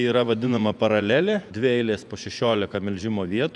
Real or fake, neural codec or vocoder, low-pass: fake; vocoder, 24 kHz, 100 mel bands, Vocos; 10.8 kHz